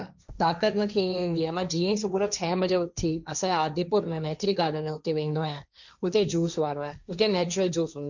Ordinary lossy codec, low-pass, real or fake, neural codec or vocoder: none; 7.2 kHz; fake; codec, 16 kHz, 1.1 kbps, Voila-Tokenizer